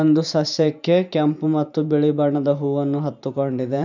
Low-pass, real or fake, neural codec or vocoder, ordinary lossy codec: 7.2 kHz; fake; vocoder, 44.1 kHz, 128 mel bands every 512 samples, BigVGAN v2; none